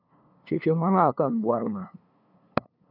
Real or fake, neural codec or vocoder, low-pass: fake; codec, 16 kHz, 2 kbps, FunCodec, trained on LibriTTS, 25 frames a second; 5.4 kHz